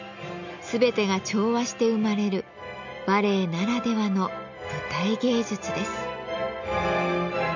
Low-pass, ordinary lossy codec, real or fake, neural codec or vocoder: 7.2 kHz; none; real; none